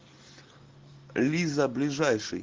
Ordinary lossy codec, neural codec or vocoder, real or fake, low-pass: Opus, 16 kbps; none; real; 7.2 kHz